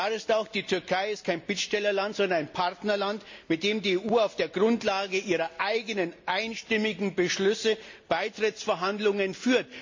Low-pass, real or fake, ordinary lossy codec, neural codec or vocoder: 7.2 kHz; real; MP3, 64 kbps; none